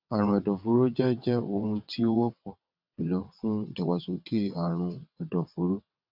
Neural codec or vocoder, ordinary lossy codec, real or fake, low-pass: vocoder, 22.05 kHz, 80 mel bands, WaveNeXt; none; fake; 5.4 kHz